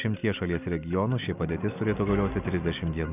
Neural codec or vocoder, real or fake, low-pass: none; real; 3.6 kHz